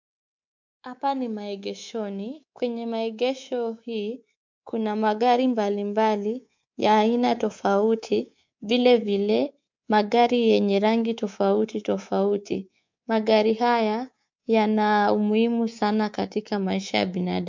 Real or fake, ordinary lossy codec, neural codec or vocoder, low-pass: fake; MP3, 64 kbps; codec, 24 kHz, 3.1 kbps, DualCodec; 7.2 kHz